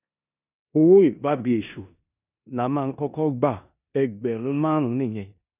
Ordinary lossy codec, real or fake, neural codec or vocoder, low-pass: none; fake; codec, 16 kHz in and 24 kHz out, 0.9 kbps, LongCat-Audio-Codec, four codebook decoder; 3.6 kHz